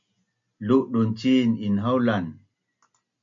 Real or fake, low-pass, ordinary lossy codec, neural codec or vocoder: real; 7.2 kHz; MP3, 64 kbps; none